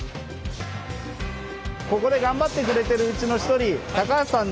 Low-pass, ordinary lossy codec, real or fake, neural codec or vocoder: none; none; real; none